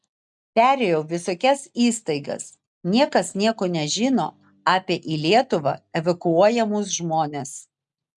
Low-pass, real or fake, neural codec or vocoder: 10.8 kHz; real; none